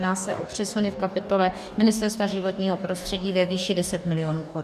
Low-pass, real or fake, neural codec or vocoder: 14.4 kHz; fake; codec, 44.1 kHz, 2.6 kbps, DAC